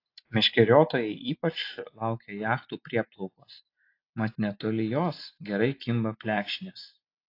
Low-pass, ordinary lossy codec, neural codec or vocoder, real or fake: 5.4 kHz; AAC, 32 kbps; none; real